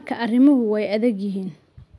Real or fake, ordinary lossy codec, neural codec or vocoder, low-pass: real; none; none; none